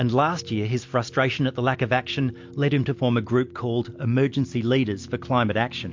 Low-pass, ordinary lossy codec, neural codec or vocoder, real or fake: 7.2 kHz; MP3, 48 kbps; none; real